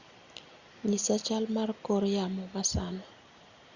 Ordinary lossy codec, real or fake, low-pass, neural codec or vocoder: Opus, 64 kbps; real; 7.2 kHz; none